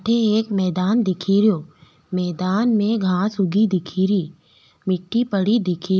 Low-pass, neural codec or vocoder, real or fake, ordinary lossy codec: none; none; real; none